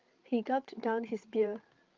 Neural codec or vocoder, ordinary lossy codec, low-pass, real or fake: codec, 16 kHz, 8 kbps, FreqCodec, larger model; Opus, 32 kbps; 7.2 kHz; fake